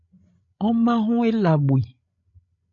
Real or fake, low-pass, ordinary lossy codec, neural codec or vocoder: fake; 7.2 kHz; MP3, 48 kbps; codec, 16 kHz, 16 kbps, FreqCodec, larger model